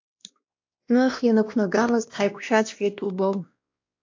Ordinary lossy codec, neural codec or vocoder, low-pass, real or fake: AAC, 48 kbps; codec, 16 kHz, 1 kbps, X-Codec, WavLM features, trained on Multilingual LibriSpeech; 7.2 kHz; fake